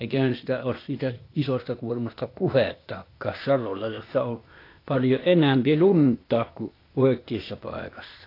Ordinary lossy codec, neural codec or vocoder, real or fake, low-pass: AAC, 32 kbps; codec, 16 kHz, 0.8 kbps, ZipCodec; fake; 5.4 kHz